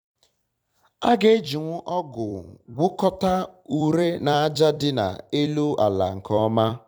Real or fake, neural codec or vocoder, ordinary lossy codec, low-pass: fake; vocoder, 44.1 kHz, 128 mel bands every 256 samples, BigVGAN v2; none; 19.8 kHz